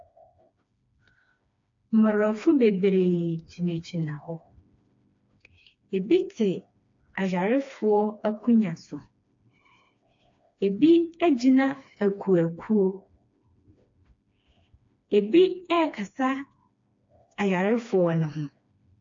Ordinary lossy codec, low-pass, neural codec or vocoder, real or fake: AAC, 48 kbps; 7.2 kHz; codec, 16 kHz, 2 kbps, FreqCodec, smaller model; fake